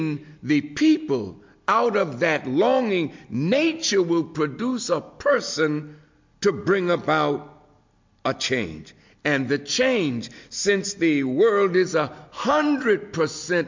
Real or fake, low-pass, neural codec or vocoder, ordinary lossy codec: real; 7.2 kHz; none; MP3, 48 kbps